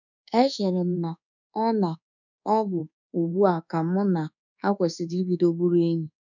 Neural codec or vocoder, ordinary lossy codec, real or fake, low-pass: codec, 24 kHz, 1.2 kbps, DualCodec; none; fake; 7.2 kHz